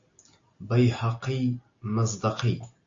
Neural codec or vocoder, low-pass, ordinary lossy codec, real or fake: none; 7.2 kHz; AAC, 48 kbps; real